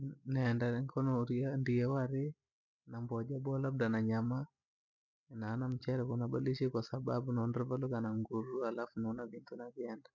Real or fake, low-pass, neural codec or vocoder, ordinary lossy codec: real; 7.2 kHz; none; none